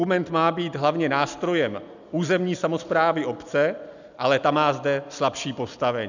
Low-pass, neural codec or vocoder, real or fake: 7.2 kHz; none; real